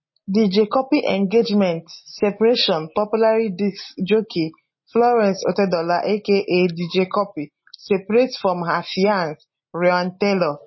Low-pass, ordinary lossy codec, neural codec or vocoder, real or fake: 7.2 kHz; MP3, 24 kbps; none; real